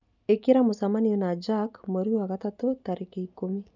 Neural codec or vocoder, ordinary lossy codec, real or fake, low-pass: none; none; real; 7.2 kHz